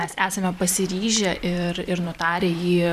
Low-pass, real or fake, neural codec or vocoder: 14.4 kHz; fake; vocoder, 44.1 kHz, 128 mel bands every 512 samples, BigVGAN v2